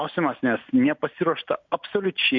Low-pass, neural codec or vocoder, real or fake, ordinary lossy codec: 7.2 kHz; none; real; MP3, 48 kbps